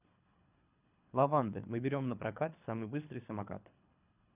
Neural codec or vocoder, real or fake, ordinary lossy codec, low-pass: codec, 24 kHz, 3 kbps, HILCodec; fake; none; 3.6 kHz